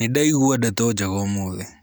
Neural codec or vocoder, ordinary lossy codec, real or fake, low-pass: none; none; real; none